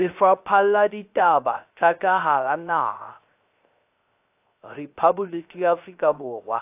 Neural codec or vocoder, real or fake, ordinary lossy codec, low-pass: codec, 16 kHz, 0.3 kbps, FocalCodec; fake; AAC, 32 kbps; 3.6 kHz